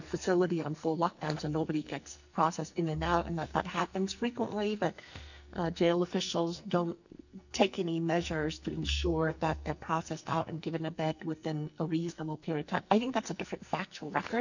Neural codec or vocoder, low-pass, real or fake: codec, 44.1 kHz, 2.6 kbps, SNAC; 7.2 kHz; fake